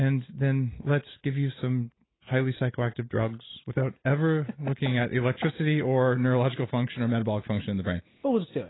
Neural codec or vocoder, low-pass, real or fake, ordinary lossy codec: none; 7.2 kHz; real; AAC, 16 kbps